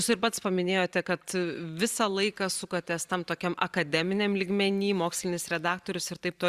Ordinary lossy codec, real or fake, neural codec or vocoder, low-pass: Opus, 64 kbps; fake; vocoder, 44.1 kHz, 128 mel bands every 512 samples, BigVGAN v2; 14.4 kHz